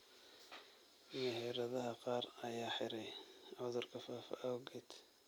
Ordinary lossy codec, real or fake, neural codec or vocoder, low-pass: none; real; none; none